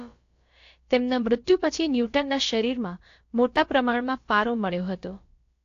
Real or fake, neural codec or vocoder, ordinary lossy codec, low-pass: fake; codec, 16 kHz, about 1 kbps, DyCAST, with the encoder's durations; AAC, 48 kbps; 7.2 kHz